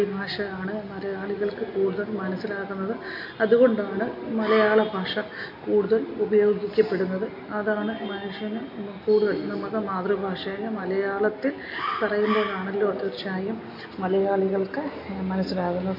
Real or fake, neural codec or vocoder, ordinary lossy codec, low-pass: real; none; MP3, 32 kbps; 5.4 kHz